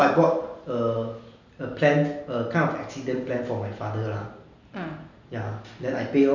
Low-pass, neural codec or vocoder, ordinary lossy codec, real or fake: 7.2 kHz; none; none; real